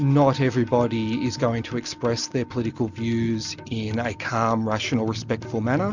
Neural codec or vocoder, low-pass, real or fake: none; 7.2 kHz; real